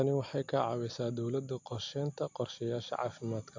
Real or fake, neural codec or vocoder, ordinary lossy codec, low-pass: real; none; MP3, 48 kbps; 7.2 kHz